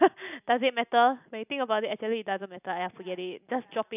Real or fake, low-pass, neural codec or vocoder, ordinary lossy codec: real; 3.6 kHz; none; none